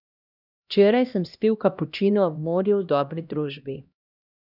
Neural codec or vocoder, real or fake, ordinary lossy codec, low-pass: codec, 16 kHz, 1 kbps, X-Codec, HuBERT features, trained on LibriSpeech; fake; none; 5.4 kHz